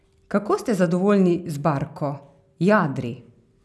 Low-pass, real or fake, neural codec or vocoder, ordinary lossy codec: none; real; none; none